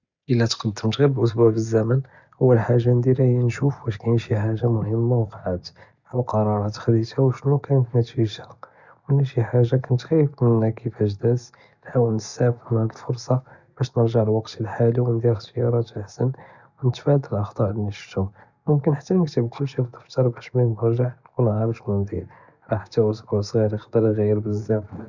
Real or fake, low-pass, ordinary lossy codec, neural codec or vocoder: real; 7.2 kHz; none; none